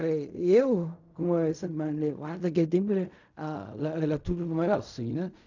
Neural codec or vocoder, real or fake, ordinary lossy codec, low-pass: codec, 16 kHz in and 24 kHz out, 0.4 kbps, LongCat-Audio-Codec, fine tuned four codebook decoder; fake; none; 7.2 kHz